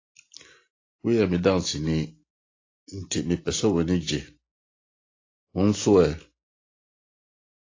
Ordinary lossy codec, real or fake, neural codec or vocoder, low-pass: AAC, 32 kbps; real; none; 7.2 kHz